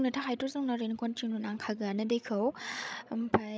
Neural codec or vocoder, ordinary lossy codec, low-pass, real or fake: codec, 16 kHz, 16 kbps, FreqCodec, larger model; none; none; fake